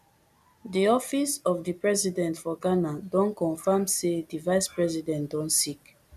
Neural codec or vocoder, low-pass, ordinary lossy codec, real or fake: none; 14.4 kHz; none; real